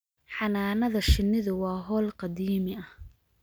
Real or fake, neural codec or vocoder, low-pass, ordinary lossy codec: real; none; none; none